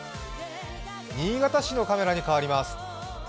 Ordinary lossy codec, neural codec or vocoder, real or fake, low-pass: none; none; real; none